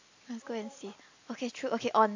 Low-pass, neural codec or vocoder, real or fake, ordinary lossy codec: 7.2 kHz; none; real; AAC, 48 kbps